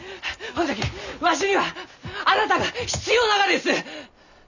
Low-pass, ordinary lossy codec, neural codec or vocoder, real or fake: 7.2 kHz; none; none; real